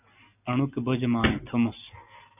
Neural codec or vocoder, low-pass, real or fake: none; 3.6 kHz; real